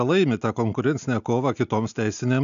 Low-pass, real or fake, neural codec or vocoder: 7.2 kHz; real; none